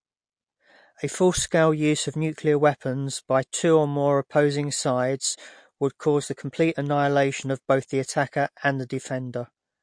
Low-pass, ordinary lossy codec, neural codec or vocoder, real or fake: 9.9 kHz; MP3, 48 kbps; none; real